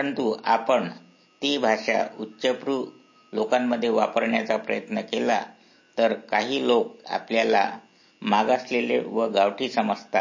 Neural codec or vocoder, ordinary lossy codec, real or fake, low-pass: none; MP3, 32 kbps; real; 7.2 kHz